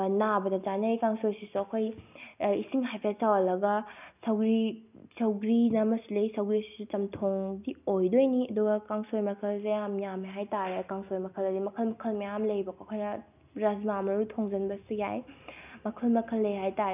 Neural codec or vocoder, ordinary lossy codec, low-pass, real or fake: none; none; 3.6 kHz; real